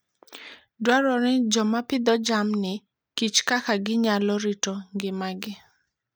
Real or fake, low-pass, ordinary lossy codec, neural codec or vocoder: real; none; none; none